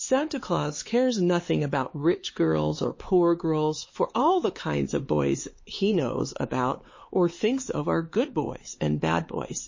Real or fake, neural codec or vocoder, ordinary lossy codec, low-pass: fake; codec, 16 kHz, 4 kbps, X-Codec, HuBERT features, trained on LibriSpeech; MP3, 32 kbps; 7.2 kHz